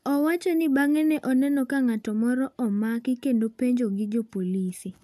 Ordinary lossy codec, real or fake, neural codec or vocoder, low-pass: none; real; none; 14.4 kHz